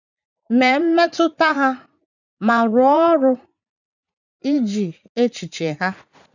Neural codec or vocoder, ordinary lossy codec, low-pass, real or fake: vocoder, 44.1 kHz, 80 mel bands, Vocos; none; 7.2 kHz; fake